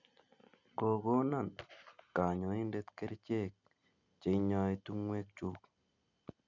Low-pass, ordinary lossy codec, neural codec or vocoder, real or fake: 7.2 kHz; none; none; real